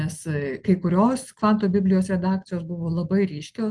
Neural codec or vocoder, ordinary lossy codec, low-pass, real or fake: none; Opus, 24 kbps; 10.8 kHz; real